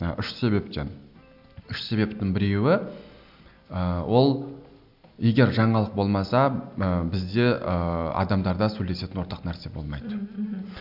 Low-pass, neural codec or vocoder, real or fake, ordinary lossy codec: 5.4 kHz; none; real; AAC, 48 kbps